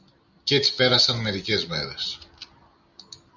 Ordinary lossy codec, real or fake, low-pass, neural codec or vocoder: Opus, 64 kbps; real; 7.2 kHz; none